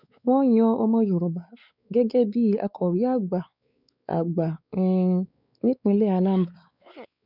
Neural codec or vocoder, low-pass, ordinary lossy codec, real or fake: codec, 16 kHz, 4 kbps, X-Codec, WavLM features, trained on Multilingual LibriSpeech; 5.4 kHz; none; fake